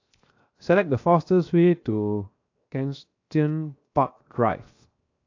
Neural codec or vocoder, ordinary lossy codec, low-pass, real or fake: codec, 16 kHz, 0.7 kbps, FocalCodec; AAC, 48 kbps; 7.2 kHz; fake